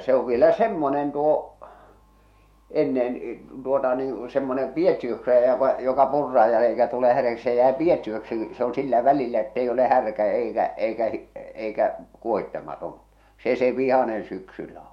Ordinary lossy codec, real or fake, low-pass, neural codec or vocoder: MP3, 64 kbps; fake; 19.8 kHz; autoencoder, 48 kHz, 128 numbers a frame, DAC-VAE, trained on Japanese speech